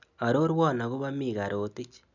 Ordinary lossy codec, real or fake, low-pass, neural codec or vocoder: none; real; 7.2 kHz; none